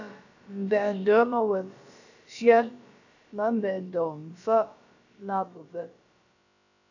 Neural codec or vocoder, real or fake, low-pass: codec, 16 kHz, about 1 kbps, DyCAST, with the encoder's durations; fake; 7.2 kHz